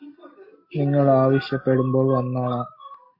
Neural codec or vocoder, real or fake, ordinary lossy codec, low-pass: none; real; MP3, 32 kbps; 5.4 kHz